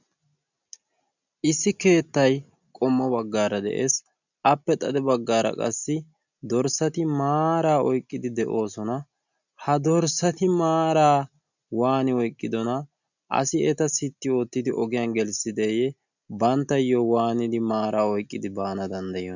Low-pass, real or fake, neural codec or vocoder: 7.2 kHz; real; none